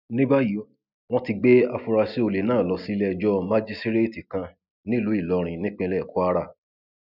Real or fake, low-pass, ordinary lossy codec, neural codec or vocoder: real; 5.4 kHz; none; none